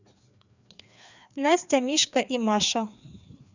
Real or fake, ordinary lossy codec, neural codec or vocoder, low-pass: fake; none; codec, 16 kHz, 2 kbps, FreqCodec, larger model; 7.2 kHz